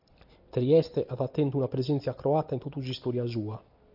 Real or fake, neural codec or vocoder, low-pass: real; none; 5.4 kHz